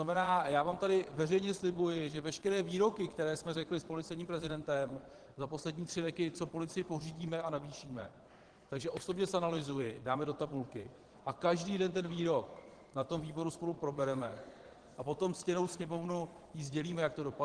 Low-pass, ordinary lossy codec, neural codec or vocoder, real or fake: 9.9 kHz; Opus, 16 kbps; vocoder, 22.05 kHz, 80 mel bands, Vocos; fake